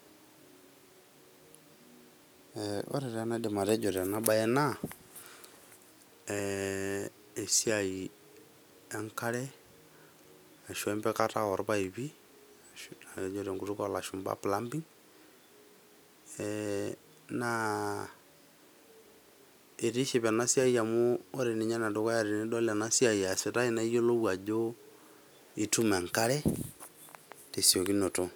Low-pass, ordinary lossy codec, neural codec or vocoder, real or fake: none; none; none; real